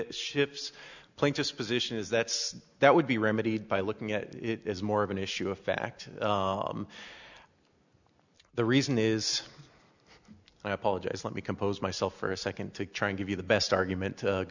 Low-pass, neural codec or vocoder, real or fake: 7.2 kHz; none; real